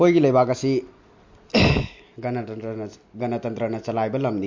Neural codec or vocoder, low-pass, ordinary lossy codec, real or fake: none; 7.2 kHz; MP3, 48 kbps; real